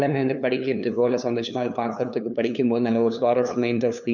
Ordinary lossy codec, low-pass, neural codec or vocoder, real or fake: none; 7.2 kHz; codec, 16 kHz, 2 kbps, FunCodec, trained on LibriTTS, 25 frames a second; fake